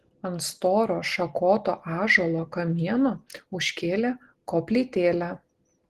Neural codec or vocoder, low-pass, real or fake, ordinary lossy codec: none; 14.4 kHz; real; Opus, 16 kbps